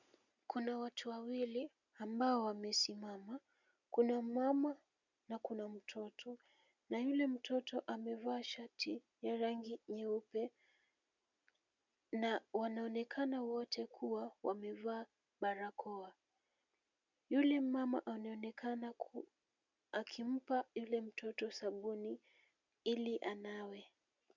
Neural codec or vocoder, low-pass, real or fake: none; 7.2 kHz; real